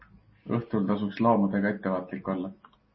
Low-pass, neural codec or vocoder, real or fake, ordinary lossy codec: 7.2 kHz; none; real; MP3, 24 kbps